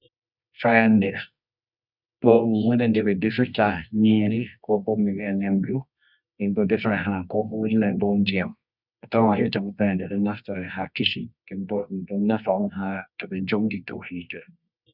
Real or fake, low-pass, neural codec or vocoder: fake; 5.4 kHz; codec, 24 kHz, 0.9 kbps, WavTokenizer, medium music audio release